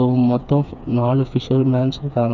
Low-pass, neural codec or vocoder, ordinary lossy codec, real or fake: 7.2 kHz; codec, 16 kHz, 4 kbps, FreqCodec, smaller model; none; fake